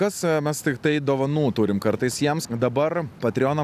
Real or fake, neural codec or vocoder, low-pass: real; none; 14.4 kHz